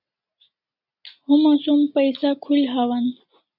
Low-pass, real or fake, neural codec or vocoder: 5.4 kHz; real; none